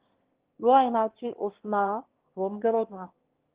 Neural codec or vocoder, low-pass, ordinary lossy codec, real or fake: autoencoder, 22.05 kHz, a latent of 192 numbers a frame, VITS, trained on one speaker; 3.6 kHz; Opus, 16 kbps; fake